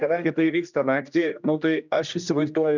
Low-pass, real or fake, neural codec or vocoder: 7.2 kHz; fake; codec, 16 kHz, 1 kbps, X-Codec, HuBERT features, trained on general audio